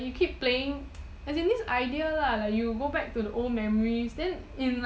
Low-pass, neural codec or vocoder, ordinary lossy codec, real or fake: none; none; none; real